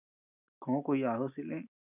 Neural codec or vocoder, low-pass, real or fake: none; 3.6 kHz; real